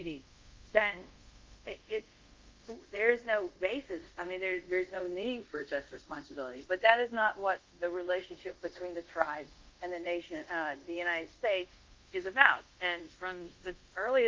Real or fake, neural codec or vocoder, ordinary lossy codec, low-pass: fake; codec, 24 kHz, 0.5 kbps, DualCodec; Opus, 32 kbps; 7.2 kHz